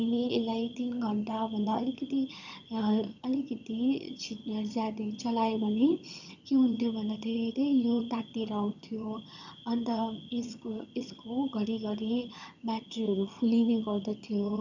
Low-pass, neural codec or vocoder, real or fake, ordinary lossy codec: 7.2 kHz; vocoder, 22.05 kHz, 80 mel bands, HiFi-GAN; fake; none